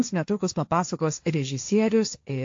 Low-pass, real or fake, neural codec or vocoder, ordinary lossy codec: 7.2 kHz; fake; codec, 16 kHz, 1.1 kbps, Voila-Tokenizer; AAC, 48 kbps